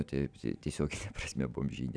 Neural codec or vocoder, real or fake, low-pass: none; real; 9.9 kHz